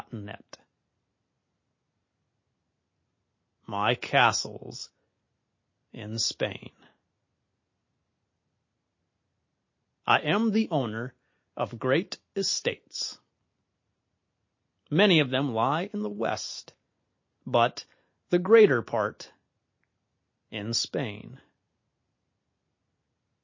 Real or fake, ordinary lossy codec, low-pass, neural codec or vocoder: real; MP3, 32 kbps; 7.2 kHz; none